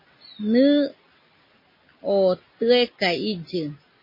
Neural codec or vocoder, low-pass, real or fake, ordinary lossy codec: none; 5.4 kHz; real; MP3, 24 kbps